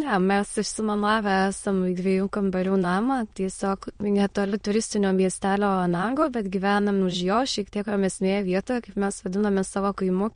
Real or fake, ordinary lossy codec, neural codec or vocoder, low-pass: fake; MP3, 48 kbps; autoencoder, 22.05 kHz, a latent of 192 numbers a frame, VITS, trained on many speakers; 9.9 kHz